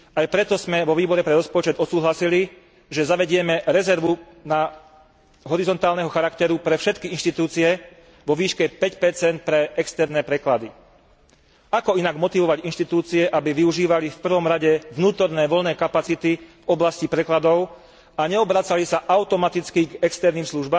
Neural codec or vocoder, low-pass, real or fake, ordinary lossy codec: none; none; real; none